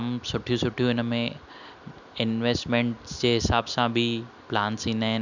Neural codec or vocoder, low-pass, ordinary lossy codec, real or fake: none; 7.2 kHz; none; real